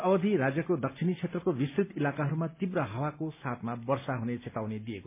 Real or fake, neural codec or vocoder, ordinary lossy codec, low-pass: real; none; MP3, 24 kbps; 3.6 kHz